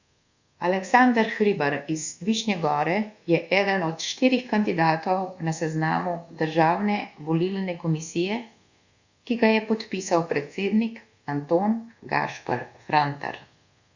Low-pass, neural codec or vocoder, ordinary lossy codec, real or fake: 7.2 kHz; codec, 24 kHz, 1.2 kbps, DualCodec; Opus, 64 kbps; fake